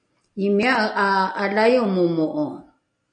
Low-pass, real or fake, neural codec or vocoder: 9.9 kHz; real; none